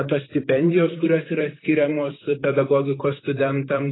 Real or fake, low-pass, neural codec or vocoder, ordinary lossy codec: fake; 7.2 kHz; vocoder, 44.1 kHz, 128 mel bands, Pupu-Vocoder; AAC, 16 kbps